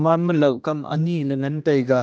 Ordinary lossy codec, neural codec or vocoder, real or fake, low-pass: none; codec, 16 kHz, 1 kbps, X-Codec, HuBERT features, trained on general audio; fake; none